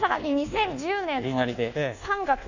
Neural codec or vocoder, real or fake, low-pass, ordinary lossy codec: codec, 24 kHz, 1.2 kbps, DualCodec; fake; 7.2 kHz; none